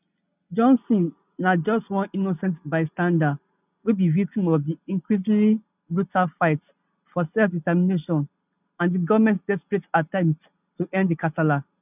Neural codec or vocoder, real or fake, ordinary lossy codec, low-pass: none; real; none; 3.6 kHz